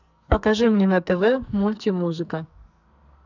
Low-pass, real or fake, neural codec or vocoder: 7.2 kHz; fake; codec, 44.1 kHz, 2.6 kbps, SNAC